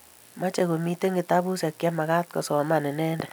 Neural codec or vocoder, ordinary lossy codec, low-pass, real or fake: none; none; none; real